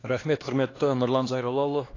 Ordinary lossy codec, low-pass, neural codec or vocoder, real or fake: AAC, 32 kbps; 7.2 kHz; codec, 16 kHz, 2 kbps, X-Codec, HuBERT features, trained on LibriSpeech; fake